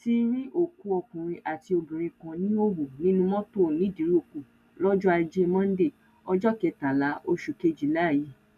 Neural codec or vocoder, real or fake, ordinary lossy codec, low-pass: none; real; none; 14.4 kHz